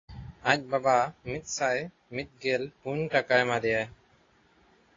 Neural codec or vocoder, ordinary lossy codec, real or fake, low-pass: none; AAC, 32 kbps; real; 7.2 kHz